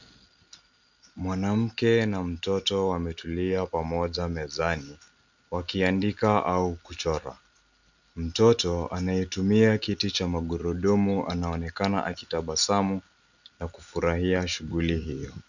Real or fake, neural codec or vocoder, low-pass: real; none; 7.2 kHz